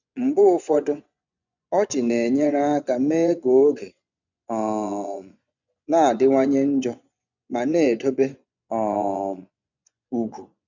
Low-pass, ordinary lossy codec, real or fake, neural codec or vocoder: 7.2 kHz; none; fake; vocoder, 24 kHz, 100 mel bands, Vocos